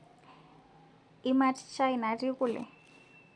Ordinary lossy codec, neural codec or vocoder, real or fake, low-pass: none; none; real; 9.9 kHz